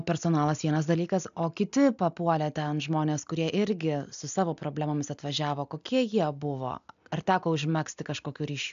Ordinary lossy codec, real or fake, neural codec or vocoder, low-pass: AAC, 96 kbps; real; none; 7.2 kHz